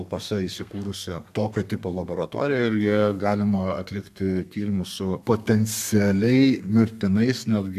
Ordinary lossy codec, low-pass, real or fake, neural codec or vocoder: MP3, 96 kbps; 14.4 kHz; fake; codec, 32 kHz, 1.9 kbps, SNAC